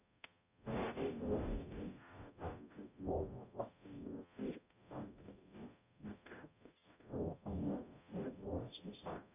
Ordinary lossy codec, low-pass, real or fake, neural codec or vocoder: none; 3.6 kHz; fake; codec, 44.1 kHz, 0.9 kbps, DAC